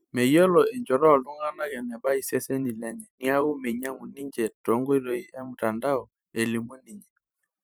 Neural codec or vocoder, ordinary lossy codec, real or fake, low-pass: vocoder, 44.1 kHz, 128 mel bands every 512 samples, BigVGAN v2; none; fake; none